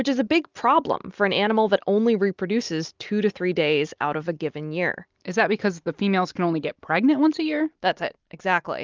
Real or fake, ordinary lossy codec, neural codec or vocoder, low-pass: real; Opus, 32 kbps; none; 7.2 kHz